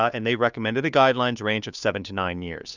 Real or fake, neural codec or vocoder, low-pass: fake; codec, 16 kHz, 2 kbps, FunCodec, trained on Chinese and English, 25 frames a second; 7.2 kHz